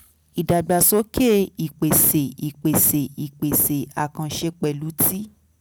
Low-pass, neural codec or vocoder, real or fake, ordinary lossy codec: none; none; real; none